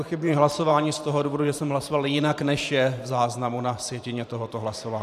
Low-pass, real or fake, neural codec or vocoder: 14.4 kHz; fake; vocoder, 44.1 kHz, 128 mel bands every 512 samples, BigVGAN v2